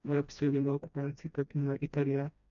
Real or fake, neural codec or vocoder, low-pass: fake; codec, 16 kHz, 1 kbps, FreqCodec, smaller model; 7.2 kHz